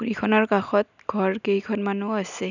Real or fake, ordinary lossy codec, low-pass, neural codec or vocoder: real; none; 7.2 kHz; none